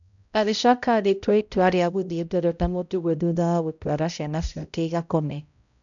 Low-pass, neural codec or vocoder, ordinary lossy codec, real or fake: 7.2 kHz; codec, 16 kHz, 0.5 kbps, X-Codec, HuBERT features, trained on balanced general audio; none; fake